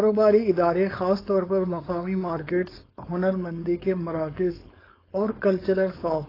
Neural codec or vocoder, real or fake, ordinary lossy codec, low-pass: codec, 16 kHz, 4.8 kbps, FACodec; fake; AAC, 24 kbps; 5.4 kHz